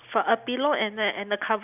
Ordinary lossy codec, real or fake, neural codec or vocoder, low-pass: none; real; none; 3.6 kHz